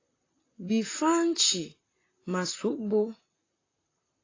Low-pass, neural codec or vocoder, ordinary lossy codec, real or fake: 7.2 kHz; vocoder, 44.1 kHz, 128 mel bands, Pupu-Vocoder; AAC, 32 kbps; fake